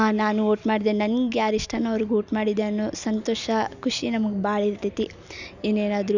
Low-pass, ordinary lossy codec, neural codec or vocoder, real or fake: 7.2 kHz; none; none; real